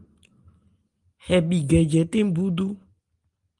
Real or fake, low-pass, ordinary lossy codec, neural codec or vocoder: real; 10.8 kHz; Opus, 24 kbps; none